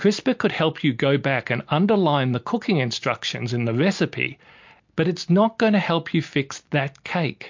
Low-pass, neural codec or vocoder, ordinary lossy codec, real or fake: 7.2 kHz; none; MP3, 48 kbps; real